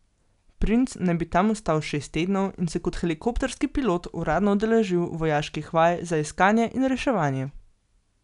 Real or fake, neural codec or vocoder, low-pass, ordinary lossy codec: real; none; 10.8 kHz; none